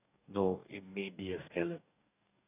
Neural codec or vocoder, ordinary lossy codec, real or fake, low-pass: codec, 44.1 kHz, 2.6 kbps, DAC; none; fake; 3.6 kHz